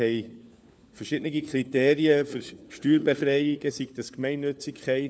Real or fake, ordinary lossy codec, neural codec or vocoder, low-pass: fake; none; codec, 16 kHz, 4 kbps, FunCodec, trained on LibriTTS, 50 frames a second; none